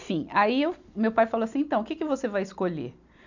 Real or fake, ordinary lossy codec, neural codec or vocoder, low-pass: real; none; none; 7.2 kHz